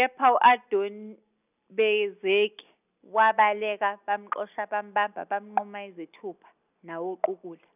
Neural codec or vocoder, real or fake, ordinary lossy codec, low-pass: none; real; none; 3.6 kHz